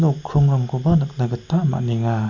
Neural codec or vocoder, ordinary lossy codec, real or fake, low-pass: none; none; real; 7.2 kHz